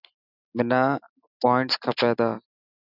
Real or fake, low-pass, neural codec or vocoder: real; 5.4 kHz; none